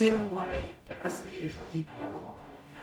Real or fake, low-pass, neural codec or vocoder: fake; 19.8 kHz; codec, 44.1 kHz, 0.9 kbps, DAC